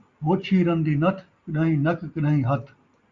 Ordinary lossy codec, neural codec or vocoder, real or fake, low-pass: Opus, 64 kbps; none; real; 7.2 kHz